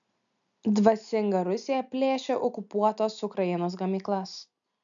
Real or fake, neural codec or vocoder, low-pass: real; none; 7.2 kHz